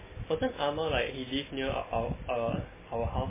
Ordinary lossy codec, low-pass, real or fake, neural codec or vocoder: MP3, 16 kbps; 3.6 kHz; real; none